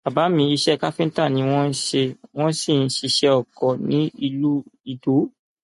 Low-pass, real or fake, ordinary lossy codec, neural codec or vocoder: 14.4 kHz; real; MP3, 48 kbps; none